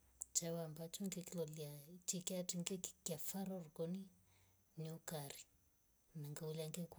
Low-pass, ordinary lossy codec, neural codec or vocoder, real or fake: none; none; none; real